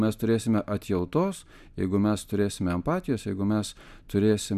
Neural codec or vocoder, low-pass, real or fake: none; 14.4 kHz; real